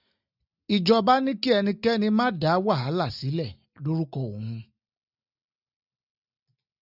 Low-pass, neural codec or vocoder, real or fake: 5.4 kHz; none; real